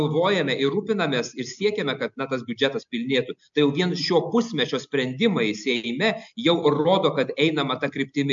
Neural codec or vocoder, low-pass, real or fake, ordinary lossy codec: none; 7.2 kHz; real; MP3, 64 kbps